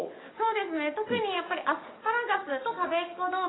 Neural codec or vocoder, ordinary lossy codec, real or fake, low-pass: codec, 44.1 kHz, 7.8 kbps, DAC; AAC, 16 kbps; fake; 7.2 kHz